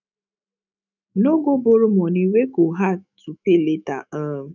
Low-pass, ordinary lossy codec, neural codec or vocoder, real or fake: 7.2 kHz; none; autoencoder, 48 kHz, 128 numbers a frame, DAC-VAE, trained on Japanese speech; fake